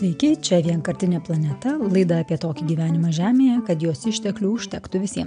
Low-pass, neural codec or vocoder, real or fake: 9.9 kHz; none; real